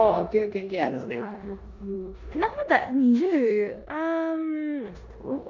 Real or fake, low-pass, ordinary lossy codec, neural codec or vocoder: fake; 7.2 kHz; none; codec, 16 kHz in and 24 kHz out, 0.9 kbps, LongCat-Audio-Codec, four codebook decoder